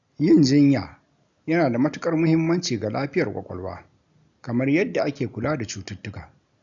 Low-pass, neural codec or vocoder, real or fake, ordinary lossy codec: 7.2 kHz; none; real; none